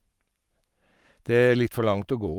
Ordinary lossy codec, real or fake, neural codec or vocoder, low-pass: Opus, 32 kbps; fake; codec, 44.1 kHz, 7.8 kbps, Pupu-Codec; 19.8 kHz